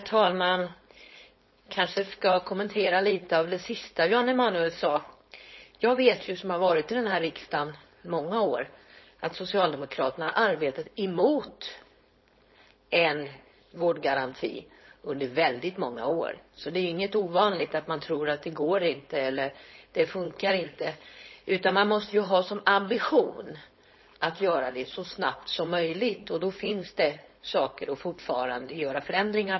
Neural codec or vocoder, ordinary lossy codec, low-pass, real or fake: codec, 16 kHz, 4.8 kbps, FACodec; MP3, 24 kbps; 7.2 kHz; fake